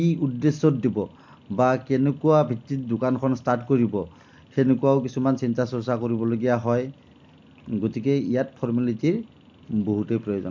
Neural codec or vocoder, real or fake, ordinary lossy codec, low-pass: none; real; MP3, 48 kbps; 7.2 kHz